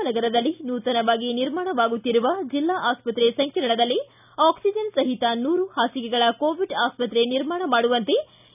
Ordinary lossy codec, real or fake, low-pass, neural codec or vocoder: none; real; 3.6 kHz; none